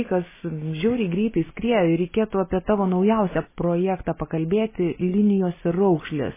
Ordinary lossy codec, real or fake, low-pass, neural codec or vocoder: MP3, 16 kbps; real; 3.6 kHz; none